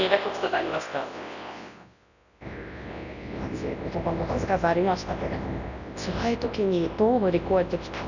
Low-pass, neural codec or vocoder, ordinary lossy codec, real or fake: 7.2 kHz; codec, 24 kHz, 0.9 kbps, WavTokenizer, large speech release; none; fake